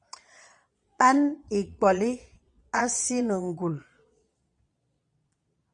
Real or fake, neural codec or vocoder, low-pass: fake; vocoder, 22.05 kHz, 80 mel bands, Vocos; 9.9 kHz